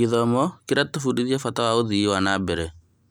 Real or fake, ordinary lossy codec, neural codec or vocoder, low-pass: real; none; none; none